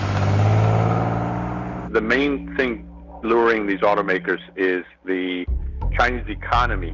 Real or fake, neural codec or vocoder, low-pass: real; none; 7.2 kHz